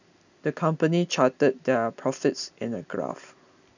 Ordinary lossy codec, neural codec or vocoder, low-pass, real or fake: none; none; 7.2 kHz; real